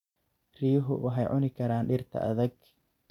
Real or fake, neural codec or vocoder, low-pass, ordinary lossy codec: real; none; 19.8 kHz; none